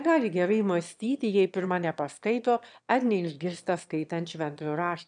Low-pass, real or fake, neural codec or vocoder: 9.9 kHz; fake; autoencoder, 22.05 kHz, a latent of 192 numbers a frame, VITS, trained on one speaker